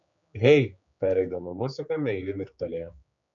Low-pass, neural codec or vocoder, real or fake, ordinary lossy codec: 7.2 kHz; codec, 16 kHz, 4 kbps, X-Codec, HuBERT features, trained on general audio; fake; MP3, 96 kbps